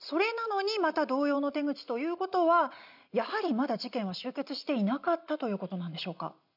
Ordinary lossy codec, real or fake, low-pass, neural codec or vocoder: none; real; 5.4 kHz; none